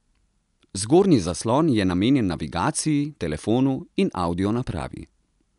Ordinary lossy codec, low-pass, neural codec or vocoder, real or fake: none; 10.8 kHz; none; real